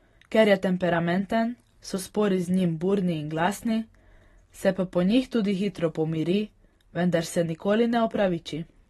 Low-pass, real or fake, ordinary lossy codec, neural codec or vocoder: 19.8 kHz; real; AAC, 32 kbps; none